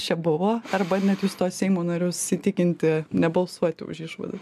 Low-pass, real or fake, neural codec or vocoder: 14.4 kHz; real; none